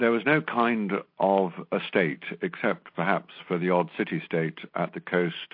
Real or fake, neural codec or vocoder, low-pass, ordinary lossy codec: real; none; 5.4 kHz; MP3, 32 kbps